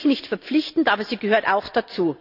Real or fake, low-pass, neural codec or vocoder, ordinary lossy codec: real; 5.4 kHz; none; none